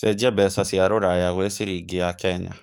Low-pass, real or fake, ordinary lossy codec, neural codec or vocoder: none; fake; none; codec, 44.1 kHz, 7.8 kbps, DAC